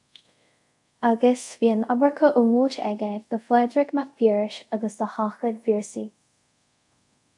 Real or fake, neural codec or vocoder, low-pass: fake; codec, 24 kHz, 0.5 kbps, DualCodec; 10.8 kHz